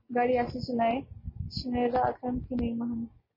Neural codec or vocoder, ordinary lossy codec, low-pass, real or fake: none; MP3, 24 kbps; 5.4 kHz; real